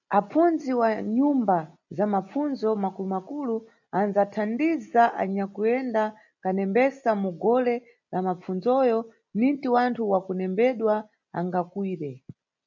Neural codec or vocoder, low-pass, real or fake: none; 7.2 kHz; real